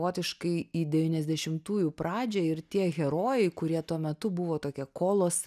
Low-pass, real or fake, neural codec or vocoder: 14.4 kHz; real; none